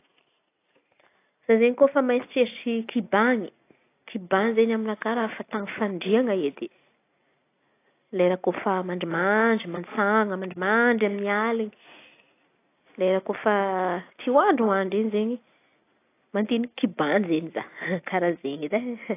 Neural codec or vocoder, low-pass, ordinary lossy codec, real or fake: none; 3.6 kHz; AAC, 24 kbps; real